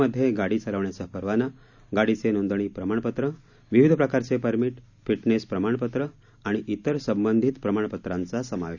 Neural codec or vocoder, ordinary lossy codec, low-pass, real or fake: none; none; 7.2 kHz; real